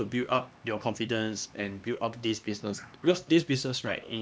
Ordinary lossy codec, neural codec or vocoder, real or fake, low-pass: none; codec, 16 kHz, 2 kbps, X-Codec, HuBERT features, trained on LibriSpeech; fake; none